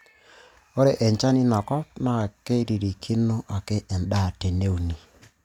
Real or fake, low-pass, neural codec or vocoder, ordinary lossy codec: real; 19.8 kHz; none; none